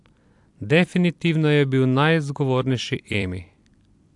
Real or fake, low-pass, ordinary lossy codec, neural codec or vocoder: fake; 10.8 kHz; AAC, 64 kbps; vocoder, 44.1 kHz, 128 mel bands every 256 samples, BigVGAN v2